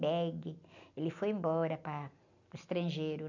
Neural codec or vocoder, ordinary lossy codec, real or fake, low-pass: none; none; real; 7.2 kHz